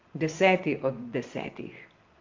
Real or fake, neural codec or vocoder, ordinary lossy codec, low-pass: fake; vocoder, 24 kHz, 100 mel bands, Vocos; Opus, 32 kbps; 7.2 kHz